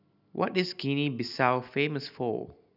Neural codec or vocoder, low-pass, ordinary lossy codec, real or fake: none; 5.4 kHz; none; real